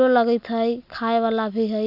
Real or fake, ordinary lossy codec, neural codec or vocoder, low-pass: real; none; none; 5.4 kHz